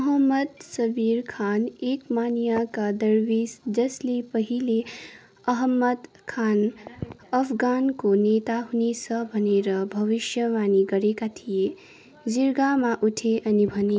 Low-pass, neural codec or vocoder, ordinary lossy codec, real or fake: none; none; none; real